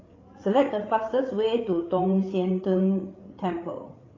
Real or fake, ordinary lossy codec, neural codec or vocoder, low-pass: fake; AAC, 32 kbps; codec, 16 kHz, 8 kbps, FreqCodec, larger model; 7.2 kHz